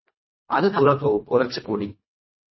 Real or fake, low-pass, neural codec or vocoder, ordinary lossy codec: fake; 7.2 kHz; codec, 24 kHz, 1.5 kbps, HILCodec; MP3, 24 kbps